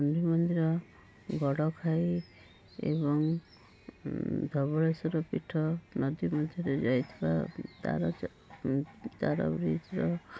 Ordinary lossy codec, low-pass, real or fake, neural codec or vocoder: none; none; real; none